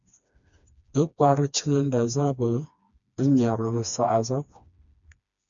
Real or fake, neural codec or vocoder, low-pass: fake; codec, 16 kHz, 2 kbps, FreqCodec, smaller model; 7.2 kHz